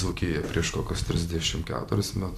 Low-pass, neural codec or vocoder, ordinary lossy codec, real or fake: 14.4 kHz; vocoder, 44.1 kHz, 128 mel bands every 256 samples, BigVGAN v2; MP3, 96 kbps; fake